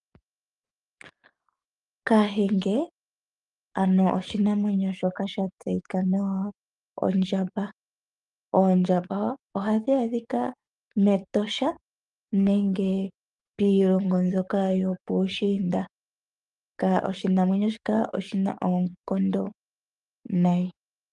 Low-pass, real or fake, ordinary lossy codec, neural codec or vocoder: 10.8 kHz; fake; Opus, 32 kbps; codec, 44.1 kHz, 7.8 kbps, DAC